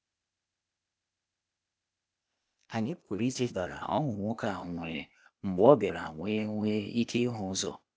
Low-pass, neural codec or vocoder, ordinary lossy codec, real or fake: none; codec, 16 kHz, 0.8 kbps, ZipCodec; none; fake